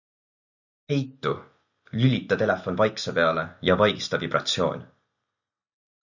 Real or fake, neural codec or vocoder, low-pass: real; none; 7.2 kHz